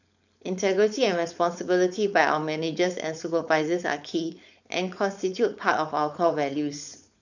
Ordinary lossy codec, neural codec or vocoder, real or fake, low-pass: none; codec, 16 kHz, 4.8 kbps, FACodec; fake; 7.2 kHz